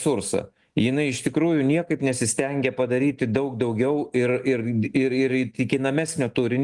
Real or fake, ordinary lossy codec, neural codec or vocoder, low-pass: real; Opus, 24 kbps; none; 10.8 kHz